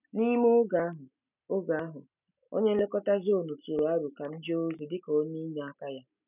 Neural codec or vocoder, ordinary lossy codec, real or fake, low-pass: none; none; real; 3.6 kHz